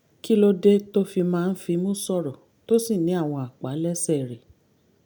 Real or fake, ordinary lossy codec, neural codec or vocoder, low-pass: real; none; none; none